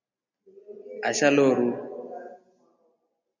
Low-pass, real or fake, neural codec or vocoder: 7.2 kHz; real; none